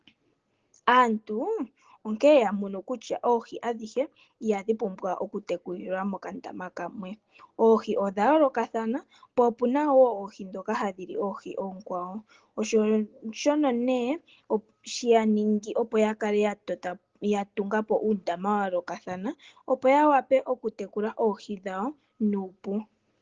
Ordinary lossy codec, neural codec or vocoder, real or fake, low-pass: Opus, 16 kbps; none; real; 7.2 kHz